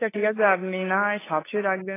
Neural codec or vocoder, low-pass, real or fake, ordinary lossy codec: codec, 16 kHz, 2 kbps, FunCodec, trained on LibriTTS, 25 frames a second; 3.6 kHz; fake; AAC, 16 kbps